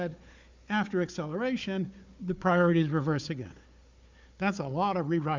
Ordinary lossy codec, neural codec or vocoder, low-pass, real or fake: MP3, 64 kbps; codec, 16 kHz, 4 kbps, FunCodec, trained on Chinese and English, 50 frames a second; 7.2 kHz; fake